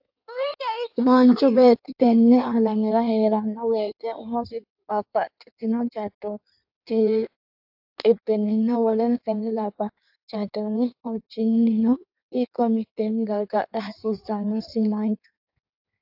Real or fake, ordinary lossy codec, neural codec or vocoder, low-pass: fake; AAC, 48 kbps; codec, 16 kHz in and 24 kHz out, 1.1 kbps, FireRedTTS-2 codec; 5.4 kHz